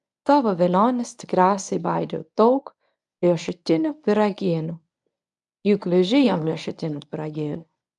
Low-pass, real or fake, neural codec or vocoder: 10.8 kHz; fake; codec, 24 kHz, 0.9 kbps, WavTokenizer, medium speech release version 1